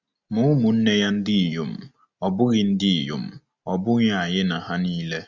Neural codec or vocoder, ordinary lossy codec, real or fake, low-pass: none; Opus, 64 kbps; real; 7.2 kHz